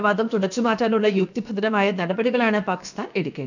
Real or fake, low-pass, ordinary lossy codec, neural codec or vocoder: fake; 7.2 kHz; none; codec, 16 kHz, about 1 kbps, DyCAST, with the encoder's durations